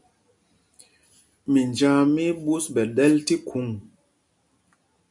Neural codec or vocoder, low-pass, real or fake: none; 10.8 kHz; real